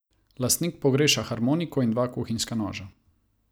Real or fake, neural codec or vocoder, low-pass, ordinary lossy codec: real; none; none; none